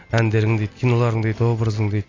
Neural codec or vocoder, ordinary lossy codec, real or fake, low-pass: none; AAC, 32 kbps; real; 7.2 kHz